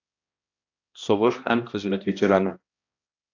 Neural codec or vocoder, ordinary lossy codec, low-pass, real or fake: codec, 16 kHz, 1 kbps, X-Codec, HuBERT features, trained on balanced general audio; AAC, 48 kbps; 7.2 kHz; fake